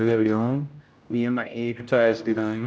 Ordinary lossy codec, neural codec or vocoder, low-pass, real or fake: none; codec, 16 kHz, 0.5 kbps, X-Codec, HuBERT features, trained on general audio; none; fake